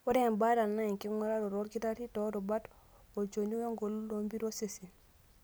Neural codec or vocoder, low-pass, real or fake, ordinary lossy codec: none; none; real; none